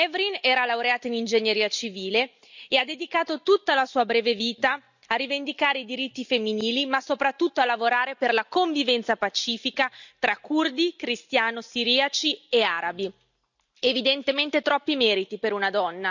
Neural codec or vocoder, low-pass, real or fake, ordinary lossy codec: none; 7.2 kHz; real; none